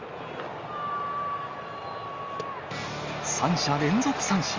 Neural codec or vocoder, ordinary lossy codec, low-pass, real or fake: none; Opus, 32 kbps; 7.2 kHz; real